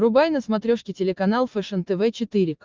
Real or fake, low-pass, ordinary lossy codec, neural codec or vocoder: real; 7.2 kHz; Opus, 24 kbps; none